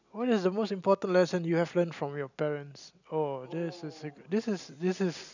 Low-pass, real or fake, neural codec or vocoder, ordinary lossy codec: 7.2 kHz; real; none; none